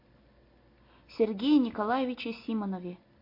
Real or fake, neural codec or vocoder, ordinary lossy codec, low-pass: real; none; MP3, 32 kbps; 5.4 kHz